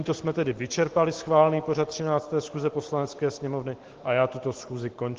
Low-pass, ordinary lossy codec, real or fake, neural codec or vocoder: 7.2 kHz; Opus, 16 kbps; real; none